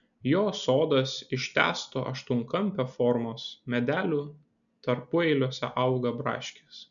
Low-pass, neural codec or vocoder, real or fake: 7.2 kHz; none; real